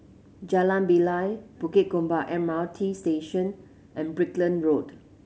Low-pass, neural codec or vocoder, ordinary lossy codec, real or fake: none; none; none; real